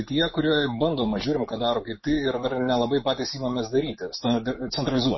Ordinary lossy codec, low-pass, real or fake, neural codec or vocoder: MP3, 24 kbps; 7.2 kHz; real; none